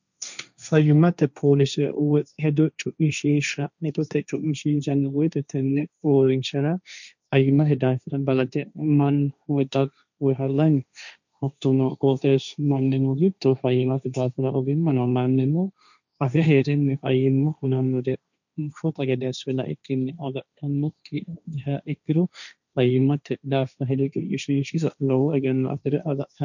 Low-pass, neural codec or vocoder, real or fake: 7.2 kHz; codec, 16 kHz, 1.1 kbps, Voila-Tokenizer; fake